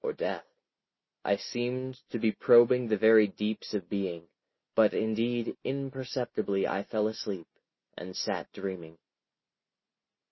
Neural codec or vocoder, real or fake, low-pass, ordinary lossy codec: none; real; 7.2 kHz; MP3, 24 kbps